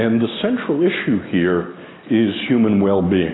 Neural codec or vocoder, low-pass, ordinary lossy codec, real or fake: none; 7.2 kHz; AAC, 16 kbps; real